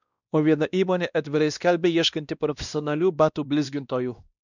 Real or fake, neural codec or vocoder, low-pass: fake; codec, 16 kHz, 1 kbps, X-Codec, WavLM features, trained on Multilingual LibriSpeech; 7.2 kHz